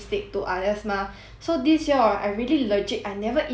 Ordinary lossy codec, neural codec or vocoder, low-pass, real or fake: none; none; none; real